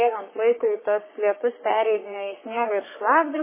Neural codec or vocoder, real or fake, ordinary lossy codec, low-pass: codec, 44.1 kHz, 3.4 kbps, Pupu-Codec; fake; MP3, 16 kbps; 3.6 kHz